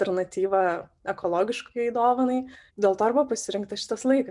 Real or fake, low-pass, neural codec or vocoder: real; 10.8 kHz; none